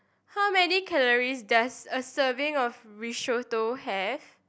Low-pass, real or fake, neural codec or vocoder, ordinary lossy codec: none; real; none; none